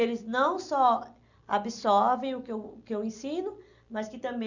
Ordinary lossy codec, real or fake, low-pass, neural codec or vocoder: none; real; 7.2 kHz; none